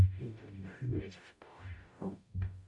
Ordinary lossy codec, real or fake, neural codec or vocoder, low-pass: AAC, 64 kbps; fake; codec, 44.1 kHz, 0.9 kbps, DAC; 10.8 kHz